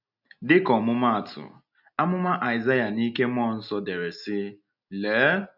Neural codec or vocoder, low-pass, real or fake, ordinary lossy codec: none; 5.4 kHz; real; none